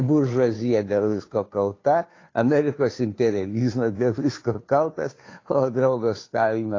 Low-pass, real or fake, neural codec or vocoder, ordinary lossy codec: 7.2 kHz; fake; codec, 16 kHz, 2 kbps, FunCodec, trained on Chinese and English, 25 frames a second; AAC, 32 kbps